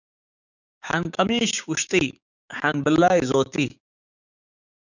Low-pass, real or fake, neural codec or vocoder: 7.2 kHz; fake; codec, 16 kHz, 6 kbps, DAC